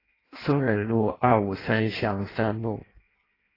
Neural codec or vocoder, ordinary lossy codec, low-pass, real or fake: codec, 16 kHz in and 24 kHz out, 0.6 kbps, FireRedTTS-2 codec; AAC, 24 kbps; 5.4 kHz; fake